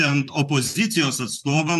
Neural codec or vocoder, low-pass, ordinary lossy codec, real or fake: vocoder, 44.1 kHz, 128 mel bands, Pupu-Vocoder; 14.4 kHz; AAC, 96 kbps; fake